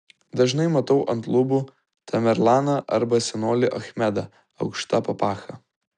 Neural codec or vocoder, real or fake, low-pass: none; real; 10.8 kHz